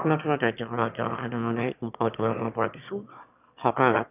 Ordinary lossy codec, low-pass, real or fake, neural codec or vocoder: none; 3.6 kHz; fake; autoencoder, 22.05 kHz, a latent of 192 numbers a frame, VITS, trained on one speaker